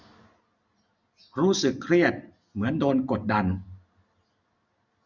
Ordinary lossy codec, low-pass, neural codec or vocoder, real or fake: none; 7.2 kHz; none; real